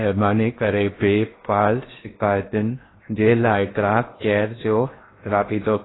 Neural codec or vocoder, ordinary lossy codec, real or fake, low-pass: codec, 16 kHz in and 24 kHz out, 0.6 kbps, FocalCodec, streaming, 2048 codes; AAC, 16 kbps; fake; 7.2 kHz